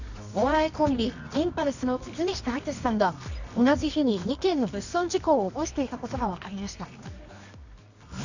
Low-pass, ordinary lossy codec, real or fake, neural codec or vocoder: 7.2 kHz; none; fake; codec, 24 kHz, 0.9 kbps, WavTokenizer, medium music audio release